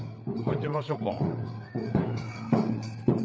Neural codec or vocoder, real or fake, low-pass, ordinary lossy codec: codec, 16 kHz, 8 kbps, FreqCodec, larger model; fake; none; none